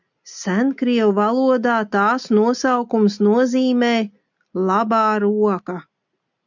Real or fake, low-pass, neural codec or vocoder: real; 7.2 kHz; none